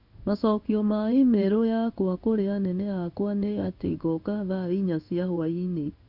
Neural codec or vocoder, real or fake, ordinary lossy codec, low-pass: codec, 16 kHz in and 24 kHz out, 1 kbps, XY-Tokenizer; fake; none; 5.4 kHz